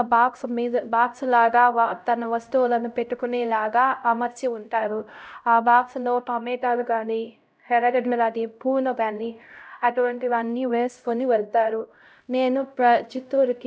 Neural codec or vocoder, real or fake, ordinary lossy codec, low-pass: codec, 16 kHz, 0.5 kbps, X-Codec, HuBERT features, trained on LibriSpeech; fake; none; none